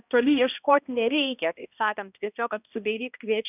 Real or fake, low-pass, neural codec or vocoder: fake; 3.6 kHz; codec, 16 kHz, 1 kbps, X-Codec, HuBERT features, trained on balanced general audio